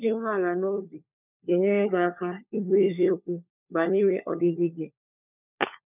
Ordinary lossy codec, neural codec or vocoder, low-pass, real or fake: none; codec, 16 kHz, 4 kbps, FunCodec, trained on LibriTTS, 50 frames a second; 3.6 kHz; fake